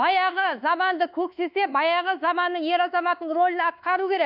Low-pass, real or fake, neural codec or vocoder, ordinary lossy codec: 5.4 kHz; fake; codec, 24 kHz, 1.2 kbps, DualCodec; none